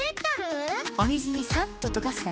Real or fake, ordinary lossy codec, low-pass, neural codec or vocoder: fake; none; none; codec, 16 kHz, 2 kbps, X-Codec, HuBERT features, trained on general audio